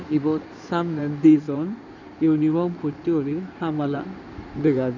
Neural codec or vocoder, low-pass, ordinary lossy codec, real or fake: codec, 16 kHz in and 24 kHz out, 2.2 kbps, FireRedTTS-2 codec; 7.2 kHz; none; fake